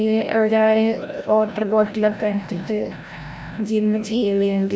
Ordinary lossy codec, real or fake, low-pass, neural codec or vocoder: none; fake; none; codec, 16 kHz, 0.5 kbps, FreqCodec, larger model